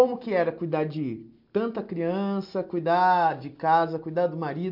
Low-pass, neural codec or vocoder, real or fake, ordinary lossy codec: 5.4 kHz; none; real; MP3, 48 kbps